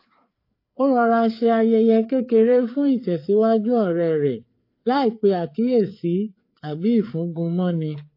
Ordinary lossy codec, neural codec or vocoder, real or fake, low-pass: AAC, 32 kbps; codec, 16 kHz, 4 kbps, FreqCodec, larger model; fake; 5.4 kHz